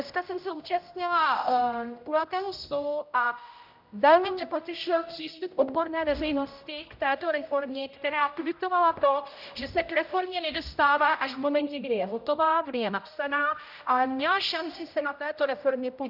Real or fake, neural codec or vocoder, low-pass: fake; codec, 16 kHz, 0.5 kbps, X-Codec, HuBERT features, trained on general audio; 5.4 kHz